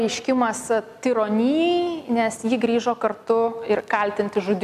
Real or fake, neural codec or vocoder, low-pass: real; none; 14.4 kHz